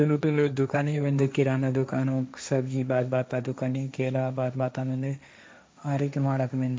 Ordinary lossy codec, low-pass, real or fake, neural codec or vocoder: none; none; fake; codec, 16 kHz, 1.1 kbps, Voila-Tokenizer